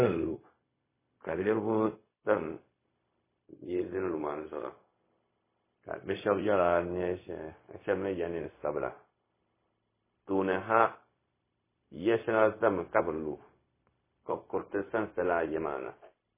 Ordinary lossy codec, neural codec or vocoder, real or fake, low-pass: MP3, 16 kbps; codec, 16 kHz, 0.4 kbps, LongCat-Audio-Codec; fake; 3.6 kHz